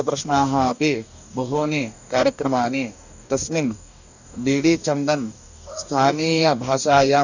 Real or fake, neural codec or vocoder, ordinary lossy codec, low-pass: fake; codec, 44.1 kHz, 2.6 kbps, DAC; none; 7.2 kHz